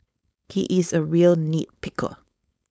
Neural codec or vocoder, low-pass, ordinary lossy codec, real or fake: codec, 16 kHz, 4.8 kbps, FACodec; none; none; fake